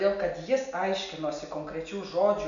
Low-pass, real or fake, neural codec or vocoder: 7.2 kHz; real; none